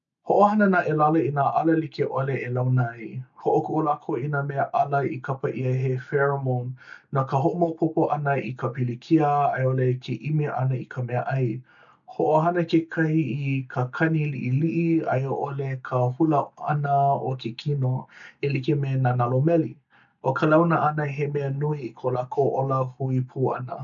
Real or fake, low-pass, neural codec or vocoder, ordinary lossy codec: real; 7.2 kHz; none; none